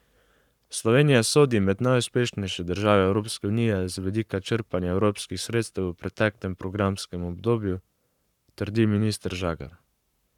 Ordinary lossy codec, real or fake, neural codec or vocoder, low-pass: none; fake; codec, 44.1 kHz, 7.8 kbps, Pupu-Codec; 19.8 kHz